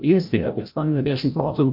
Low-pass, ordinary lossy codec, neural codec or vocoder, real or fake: 5.4 kHz; MP3, 48 kbps; codec, 16 kHz, 0.5 kbps, FreqCodec, larger model; fake